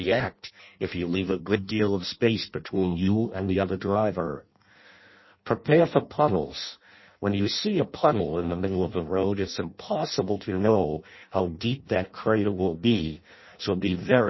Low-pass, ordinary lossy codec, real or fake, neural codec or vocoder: 7.2 kHz; MP3, 24 kbps; fake; codec, 16 kHz in and 24 kHz out, 0.6 kbps, FireRedTTS-2 codec